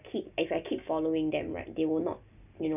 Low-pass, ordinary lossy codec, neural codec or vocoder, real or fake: 3.6 kHz; none; none; real